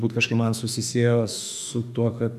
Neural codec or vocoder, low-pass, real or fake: autoencoder, 48 kHz, 32 numbers a frame, DAC-VAE, trained on Japanese speech; 14.4 kHz; fake